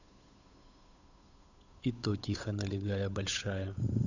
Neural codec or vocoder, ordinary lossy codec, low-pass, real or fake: codec, 16 kHz, 8 kbps, FunCodec, trained on Chinese and English, 25 frames a second; none; 7.2 kHz; fake